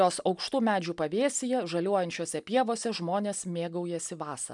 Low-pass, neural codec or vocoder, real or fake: 10.8 kHz; none; real